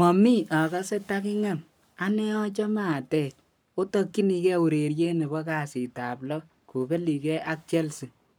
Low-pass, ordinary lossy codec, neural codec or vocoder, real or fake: none; none; codec, 44.1 kHz, 7.8 kbps, Pupu-Codec; fake